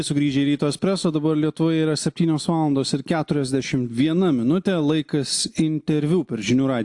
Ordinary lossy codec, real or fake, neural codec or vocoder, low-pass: AAC, 64 kbps; real; none; 10.8 kHz